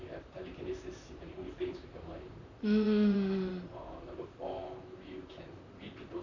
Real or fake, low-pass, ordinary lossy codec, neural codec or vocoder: fake; 7.2 kHz; none; vocoder, 44.1 kHz, 128 mel bands, Pupu-Vocoder